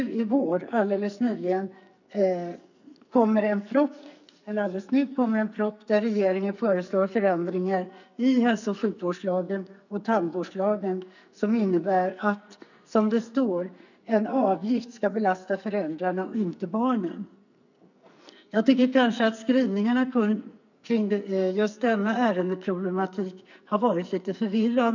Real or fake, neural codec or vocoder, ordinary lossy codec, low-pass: fake; codec, 44.1 kHz, 2.6 kbps, SNAC; none; 7.2 kHz